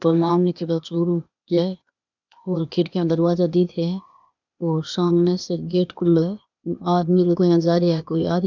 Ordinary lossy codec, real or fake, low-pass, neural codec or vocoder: none; fake; 7.2 kHz; codec, 16 kHz, 0.8 kbps, ZipCodec